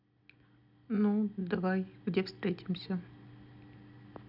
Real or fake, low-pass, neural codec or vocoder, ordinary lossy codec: real; 5.4 kHz; none; none